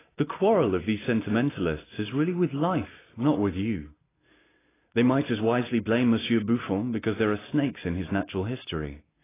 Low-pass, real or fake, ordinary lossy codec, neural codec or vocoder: 3.6 kHz; real; AAC, 16 kbps; none